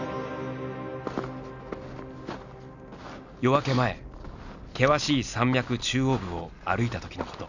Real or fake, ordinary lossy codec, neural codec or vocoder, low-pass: real; none; none; 7.2 kHz